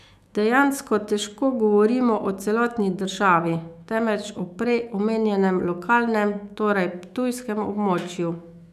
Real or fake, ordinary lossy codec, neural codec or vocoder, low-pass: fake; none; autoencoder, 48 kHz, 128 numbers a frame, DAC-VAE, trained on Japanese speech; 14.4 kHz